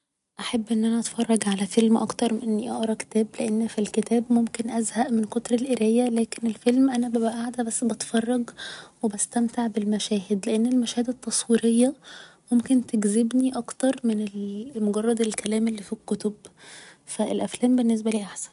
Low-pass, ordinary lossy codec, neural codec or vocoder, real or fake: 10.8 kHz; none; none; real